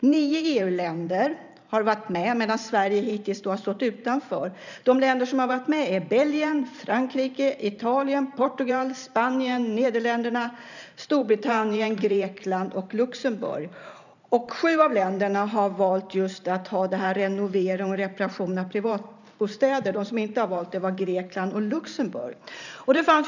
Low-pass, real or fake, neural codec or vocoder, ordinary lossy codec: 7.2 kHz; fake; vocoder, 44.1 kHz, 128 mel bands every 512 samples, BigVGAN v2; none